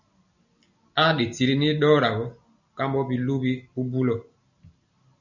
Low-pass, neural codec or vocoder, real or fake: 7.2 kHz; none; real